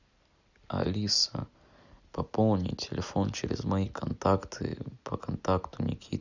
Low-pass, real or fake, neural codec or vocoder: 7.2 kHz; real; none